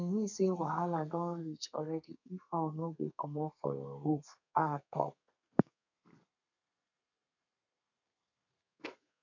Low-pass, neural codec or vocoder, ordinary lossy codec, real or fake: 7.2 kHz; codec, 32 kHz, 1.9 kbps, SNAC; none; fake